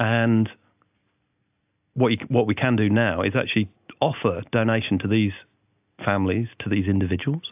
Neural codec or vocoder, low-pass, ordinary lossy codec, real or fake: none; 3.6 kHz; AAC, 32 kbps; real